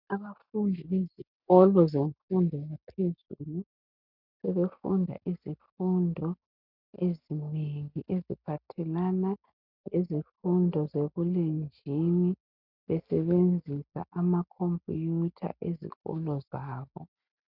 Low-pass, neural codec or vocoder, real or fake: 5.4 kHz; none; real